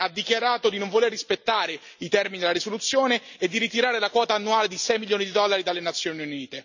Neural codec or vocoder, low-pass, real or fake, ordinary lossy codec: none; 7.2 kHz; real; none